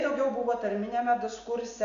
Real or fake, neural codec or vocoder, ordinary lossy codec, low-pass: real; none; MP3, 96 kbps; 7.2 kHz